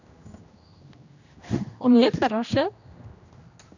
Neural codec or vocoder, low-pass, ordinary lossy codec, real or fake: codec, 16 kHz, 1 kbps, X-Codec, HuBERT features, trained on general audio; 7.2 kHz; none; fake